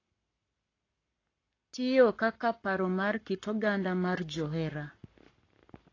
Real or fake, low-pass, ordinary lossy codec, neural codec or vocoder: fake; 7.2 kHz; AAC, 32 kbps; codec, 44.1 kHz, 7.8 kbps, Pupu-Codec